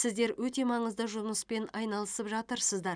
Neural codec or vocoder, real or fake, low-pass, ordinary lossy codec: none; real; 9.9 kHz; none